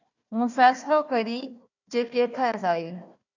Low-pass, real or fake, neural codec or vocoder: 7.2 kHz; fake; codec, 16 kHz, 1 kbps, FunCodec, trained on Chinese and English, 50 frames a second